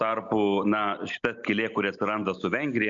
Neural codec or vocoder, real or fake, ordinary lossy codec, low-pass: none; real; MP3, 96 kbps; 7.2 kHz